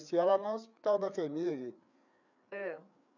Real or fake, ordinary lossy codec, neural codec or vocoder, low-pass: fake; none; codec, 16 kHz, 4 kbps, FreqCodec, larger model; 7.2 kHz